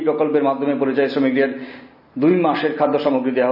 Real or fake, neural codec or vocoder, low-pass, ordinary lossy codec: real; none; 5.4 kHz; none